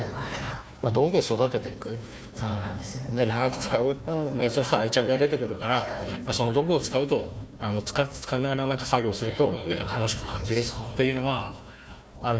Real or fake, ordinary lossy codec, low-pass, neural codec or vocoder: fake; none; none; codec, 16 kHz, 1 kbps, FunCodec, trained on Chinese and English, 50 frames a second